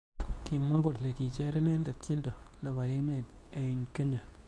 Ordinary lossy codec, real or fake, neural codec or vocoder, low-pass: none; fake; codec, 24 kHz, 0.9 kbps, WavTokenizer, medium speech release version 2; none